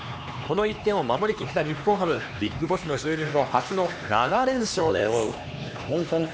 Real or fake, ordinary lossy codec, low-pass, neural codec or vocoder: fake; none; none; codec, 16 kHz, 2 kbps, X-Codec, HuBERT features, trained on LibriSpeech